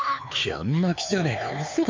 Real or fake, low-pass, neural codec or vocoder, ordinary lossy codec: fake; 7.2 kHz; codec, 16 kHz, 4 kbps, X-Codec, HuBERT features, trained on LibriSpeech; MP3, 64 kbps